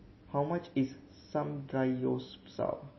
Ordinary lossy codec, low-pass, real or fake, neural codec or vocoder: MP3, 24 kbps; 7.2 kHz; real; none